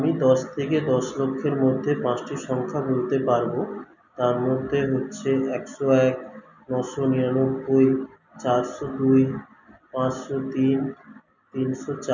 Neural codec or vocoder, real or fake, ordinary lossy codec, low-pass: none; real; none; 7.2 kHz